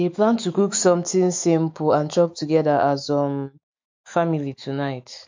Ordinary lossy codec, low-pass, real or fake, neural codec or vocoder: MP3, 48 kbps; 7.2 kHz; fake; autoencoder, 48 kHz, 128 numbers a frame, DAC-VAE, trained on Japanese speech